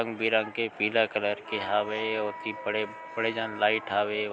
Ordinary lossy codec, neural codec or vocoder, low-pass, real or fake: none; none; none; real